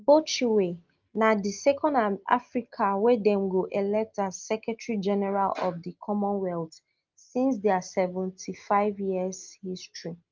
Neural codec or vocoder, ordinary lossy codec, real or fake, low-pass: none; Opus, 24 kbps; real; 7.2 kHz